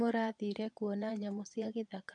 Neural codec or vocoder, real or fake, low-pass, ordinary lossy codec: vocoder, 22.05 kHz, 80 mel bands, Vocos; fake; 9.9 kHz; MP3, 64 kbps